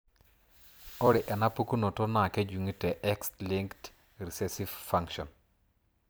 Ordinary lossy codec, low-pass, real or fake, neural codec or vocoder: none; none; fake; vocoder, 44.1 kHz, 128 mel bands every 256 samples, BigVGAN v2